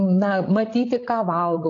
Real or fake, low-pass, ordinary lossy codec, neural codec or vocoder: fake; 7.2 kHz; AAC, 48 kbps; codec, 16 kHz, 16 kbps, FreqCodec, larger model